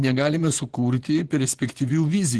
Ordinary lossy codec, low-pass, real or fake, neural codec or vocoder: Opus, 16 kbps; 10.8 kHz; fake; vocoder, 24 kHz, 100 mel bands, Vocos